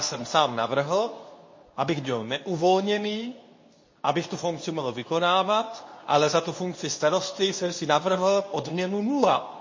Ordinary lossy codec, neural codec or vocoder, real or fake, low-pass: MP3, 32 kbps; codec, 24 kHz, 0.9 kbps, WavTokenizer, medium speech release version 2; fake; 7.2 kHz